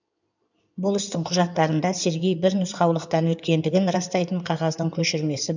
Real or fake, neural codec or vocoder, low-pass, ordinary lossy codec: fake; codec, 16 kHz in and 24 kHz out, 2.2 kbps, FireRedTTS-2 codec; 7.2 kHz; none